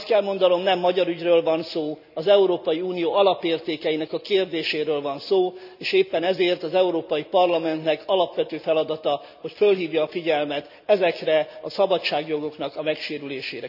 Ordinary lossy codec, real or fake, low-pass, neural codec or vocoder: none; real; 5.4 kHz; none